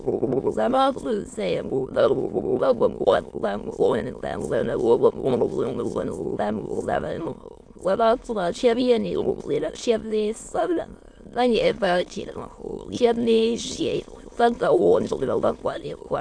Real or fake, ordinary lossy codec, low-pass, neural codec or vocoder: fake; AAC, 64 kbps; 9.9 kHz; autoencoder, 22.05 kHz, a latent of 192 numbers a frame, VITS, trained on many speakers